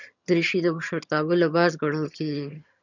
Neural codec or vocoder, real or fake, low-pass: vocoder, 22.05 kHz, 80 mel bands, HiFi-GAN; fake; 7.2 kHz